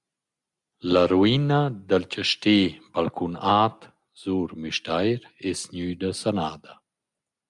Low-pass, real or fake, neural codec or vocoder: 10.8 kHz; real; none